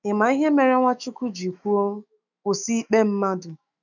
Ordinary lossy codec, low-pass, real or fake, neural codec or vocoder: none; 7.2 kHz; fake; autoencoder, 48 kHz, 128 numbers a frame, DAC-VAE, trained on Japanese speech